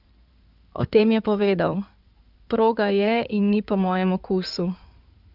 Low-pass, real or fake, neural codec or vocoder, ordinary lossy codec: 5.4 kHz; fake; codec, 16 kHz in and 24 kHz out, 2.2 kbps, FireRedTTS-2 codec; none